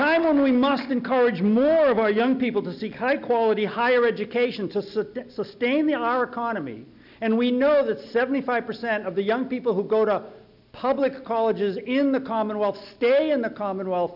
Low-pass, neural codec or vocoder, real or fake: 5.4 kHz; none; real